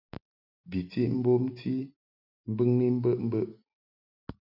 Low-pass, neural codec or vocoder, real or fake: 5.4 kHz; none; real